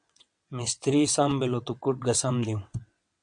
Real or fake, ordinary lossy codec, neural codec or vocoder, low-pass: fake; MP3, 64 kbps; vocoder, 22.05 kHz, 80 mel bands, WaveNeXt; 9.9 kHz